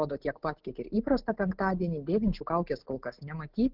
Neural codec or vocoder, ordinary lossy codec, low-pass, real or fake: none; Opus, 16 kbps; 5.4 kHz; real